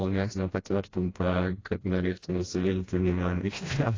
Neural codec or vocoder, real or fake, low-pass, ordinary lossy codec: codec, 16 kHz, 1 kbps, FreqCodec, smaller model; fake; 7.2 kHz; AAC, 32 kbps